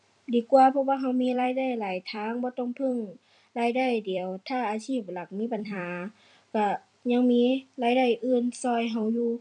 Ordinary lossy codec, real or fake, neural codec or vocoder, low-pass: none; fake; vocoder, 44.1 kHz, 128 mel bands every 256 samples, BigVGAN v2; 10.8 kHz